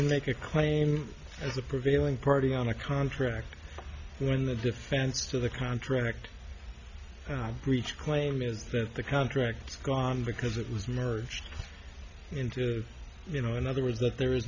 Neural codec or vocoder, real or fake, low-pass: none; real; 7.2 kHz